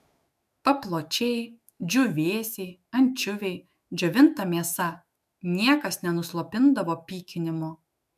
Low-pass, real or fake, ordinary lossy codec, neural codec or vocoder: 14.4 kHz; fake; MP3, 96 kbps; autoencoder, 48 kHz, 128 numbers a frame, DAC-VAE, trained on Japanese speech